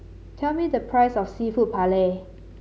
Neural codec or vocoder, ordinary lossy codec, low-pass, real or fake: none; none; none; real